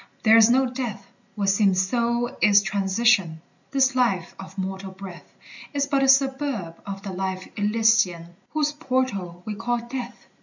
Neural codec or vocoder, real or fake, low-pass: none; real; 7.2 kHz